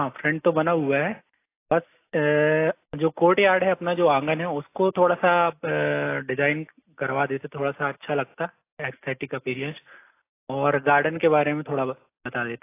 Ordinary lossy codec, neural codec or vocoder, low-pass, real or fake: AAC, 24 kbps; none; 3.6 kHz; real